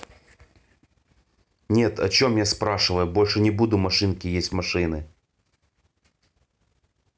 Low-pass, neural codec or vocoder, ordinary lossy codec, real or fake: none; none; none; real